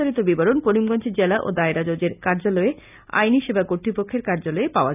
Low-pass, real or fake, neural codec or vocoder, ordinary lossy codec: 3.6 kHz; real; none; none